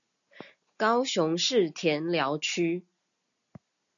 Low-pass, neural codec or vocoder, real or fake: 7.2 kHz; none; real